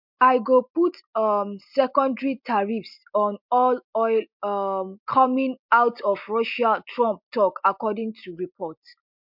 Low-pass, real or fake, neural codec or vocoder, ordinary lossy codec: 5.4 kHz; real; none; MP3, 48 kbps